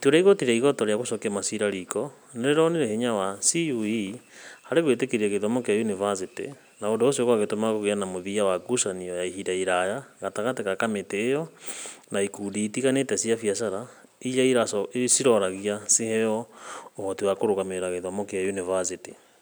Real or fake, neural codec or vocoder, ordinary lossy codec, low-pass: real; none; none; none